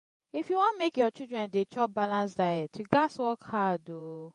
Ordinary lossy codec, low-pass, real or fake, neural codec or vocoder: MP3, 48 kbps; 14.4 kHz; fake; vocoder, 48 kHz, 128 mel bands, Vocos